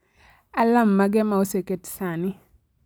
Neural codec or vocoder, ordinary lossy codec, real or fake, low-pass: none; none; real; none